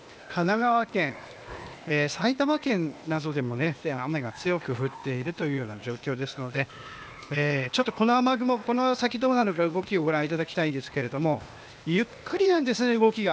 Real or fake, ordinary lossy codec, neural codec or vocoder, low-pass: fake; none; codec, 16 kHz, 0.8 kbps, ZipCodec; none